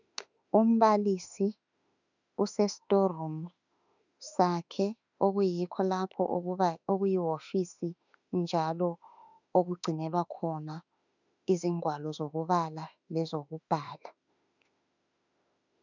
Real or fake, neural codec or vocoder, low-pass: fake; autoencoder, 48 kHz, 32 numbers a frame, DAC-VAE, trained on Japanese speech; 7.2 kHz